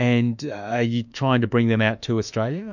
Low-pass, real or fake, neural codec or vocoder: 7.2 kHz; fake; autoencoder, 48 kHz, 32 numbers a frame, DAC-VAE, trained on Japanese speech